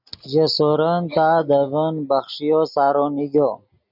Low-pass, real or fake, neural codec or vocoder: 5.4 kHz; real; none